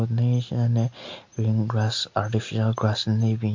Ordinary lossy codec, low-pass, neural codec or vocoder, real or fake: MP3, 48 kbps; 7.2 kHz; none; real